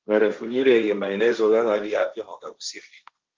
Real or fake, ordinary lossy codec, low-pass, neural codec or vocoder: fake; Opus, 24 kbps; 7.2 kHz; codec, 16 kHz, 1.1 kbps, Voila-Tokenizer